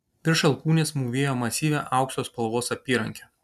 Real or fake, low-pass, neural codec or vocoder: real; 14.4 kHz; none